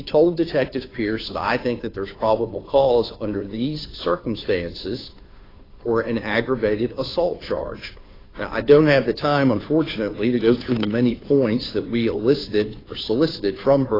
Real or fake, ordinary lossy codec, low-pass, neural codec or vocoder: fake; AAC, 24 kbps; 5.4 kHz; codec, 16 kHz, 2 kbps, FunCodec, trained on Chinese and English, 25 frames a second